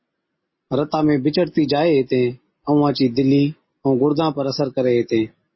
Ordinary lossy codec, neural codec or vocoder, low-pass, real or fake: MP3, 24 kbps; none; 7.2 kHz; real